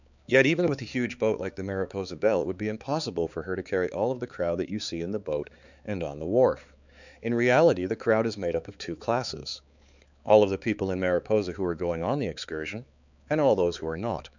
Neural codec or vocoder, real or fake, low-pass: codec, 16 kHz, 4 kbps, X-Codec, HuBERT features, trained on balanced general audio; fake; 7.2 kHz